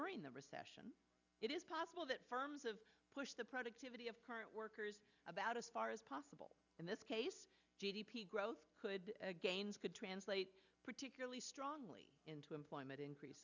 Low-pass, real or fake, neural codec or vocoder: 7.2 kHz; real; none